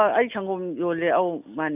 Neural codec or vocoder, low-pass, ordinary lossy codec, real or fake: none; 3.6 kHz; none; real